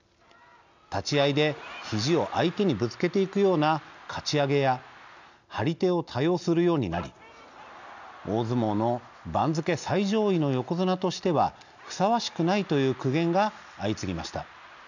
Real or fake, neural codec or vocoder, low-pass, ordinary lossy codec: real; none; 7.2 kHz; none